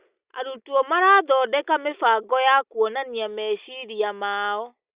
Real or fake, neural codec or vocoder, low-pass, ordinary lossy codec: real; none; 3.6 kHz; Opus, 64 kbps